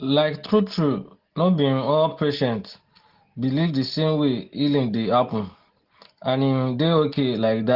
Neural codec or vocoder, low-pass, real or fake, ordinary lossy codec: none; 5.4 kHz; real; Opus, 16 kbps